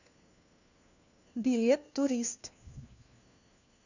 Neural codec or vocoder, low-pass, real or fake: codec, 16 kHz, 1 kbps, FunCodec, trained on LibriTTS, 50 frames a second; 7.2 kHz; fake